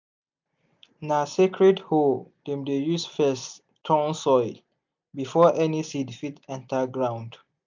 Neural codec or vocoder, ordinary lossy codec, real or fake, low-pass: none; MP3, 64 kbps; real; 7.2 kHz